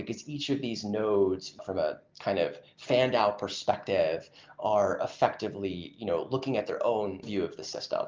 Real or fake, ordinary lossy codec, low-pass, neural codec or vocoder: real; Opus, 32 kbps; 7.2 kHz; none